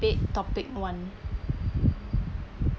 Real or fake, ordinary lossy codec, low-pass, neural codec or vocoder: real; none; none; none